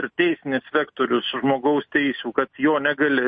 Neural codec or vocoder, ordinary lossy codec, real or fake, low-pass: none; MP3, 32 kbps; real; 9.9 kHz